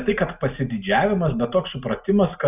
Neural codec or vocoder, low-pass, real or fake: none; 3.6 kHz; real